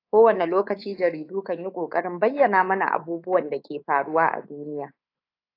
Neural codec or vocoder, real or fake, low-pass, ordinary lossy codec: codec, 24 kHz, 3.1 kbps, DualCodec; fake; 5.4 kHz; AAC, 32 kbps